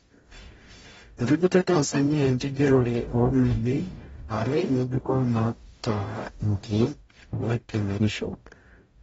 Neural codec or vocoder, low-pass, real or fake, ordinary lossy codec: codec, 44.1 kHz, 0.9 kbps, DAC; 19.8 kHz; fake; AAC, 24 kbps